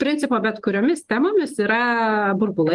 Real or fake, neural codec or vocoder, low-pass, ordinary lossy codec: real; none; 10.8 kHz; Opus, 24 kbps